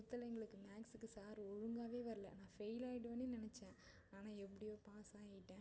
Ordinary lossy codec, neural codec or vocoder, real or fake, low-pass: none; none; real; none